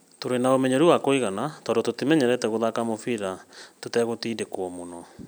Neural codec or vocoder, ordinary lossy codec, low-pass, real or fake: none; none; none; real